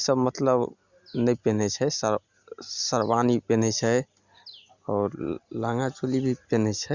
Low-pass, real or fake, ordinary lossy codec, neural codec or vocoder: 7.2 kHz; real; Opus, 64 kbps; none